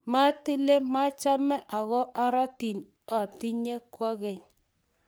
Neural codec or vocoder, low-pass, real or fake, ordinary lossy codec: codec, 44.1 kHz, 3.4 kbps, Pupu-Codec; none; fake; none